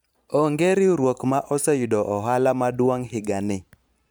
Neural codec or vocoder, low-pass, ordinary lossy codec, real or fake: vocoder, 44.1 kHz, 128 mel bands every 512 samples, BigVGAN v2; none; none; fake